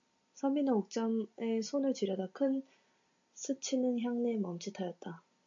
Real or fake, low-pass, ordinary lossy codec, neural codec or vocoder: real; 7.2 kHz; AAC, 64 kbps; none